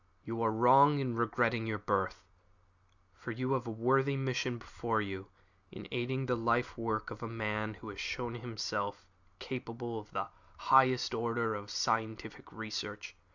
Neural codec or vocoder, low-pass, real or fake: none; 7.2 kHz; real